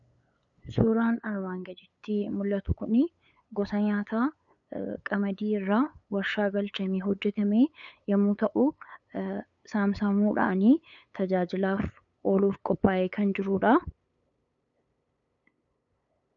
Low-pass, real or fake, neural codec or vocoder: 7.2 kHz; fake; codec, 16 kHz, 8 kbps, FunCodec, trained on LibriTTS, 25 frames a second